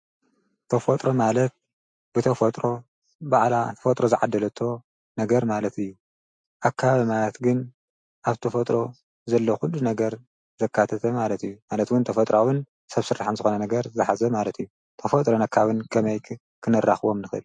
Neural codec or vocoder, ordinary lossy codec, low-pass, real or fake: none; MP3, 48 kbps; 9.9 kHz; real